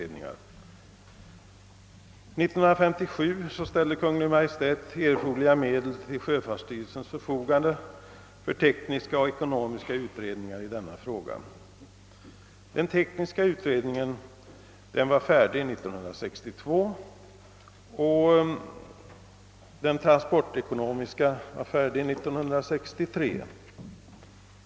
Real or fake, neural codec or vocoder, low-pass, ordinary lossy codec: real; none; none; none